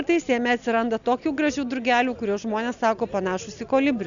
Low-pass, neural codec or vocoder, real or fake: 7.2 kHz; none; real